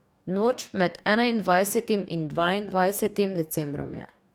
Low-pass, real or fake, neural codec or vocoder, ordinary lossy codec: 19.8 kHz; fake; codec, 44.1 kHz, 2.6 kbps, DAC; none